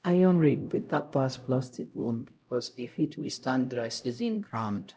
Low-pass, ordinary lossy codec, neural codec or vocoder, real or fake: none; none; codec, 16 kHz, 0.5 kbps, X-Codec, HuBERT features, trained on LibriSpeech; fake